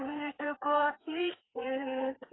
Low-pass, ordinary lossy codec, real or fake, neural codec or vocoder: 7.2 kHz; AAC, 16 kbps; fake; codec, 16 kHz, 4 kbps, FreqCodec, larger model